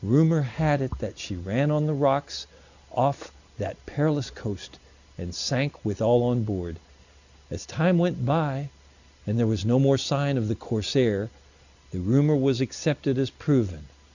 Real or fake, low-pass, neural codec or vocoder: real; 7.2 kHz; none